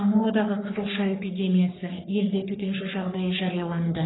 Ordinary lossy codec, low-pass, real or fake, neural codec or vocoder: AAC, 16 kbps; 7.2 kHz; fake; codec, 44.1 kHz, 3.4 kbps, Pupu-Codec